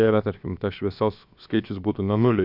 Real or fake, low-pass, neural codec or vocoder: fake; 5.4 kHz; codec, 16 kHz, about 1 kbps, DyCAST, with the encoder's durations